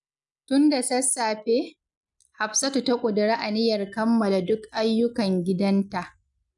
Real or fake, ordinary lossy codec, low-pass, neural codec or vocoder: real; none; 10.8 kHz; none